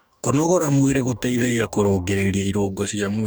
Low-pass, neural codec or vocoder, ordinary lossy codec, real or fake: none; codec, 44.1 kHz, 2.6 kbps, DAC; none; fake